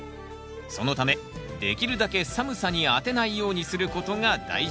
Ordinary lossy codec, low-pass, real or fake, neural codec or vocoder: none; none; real; none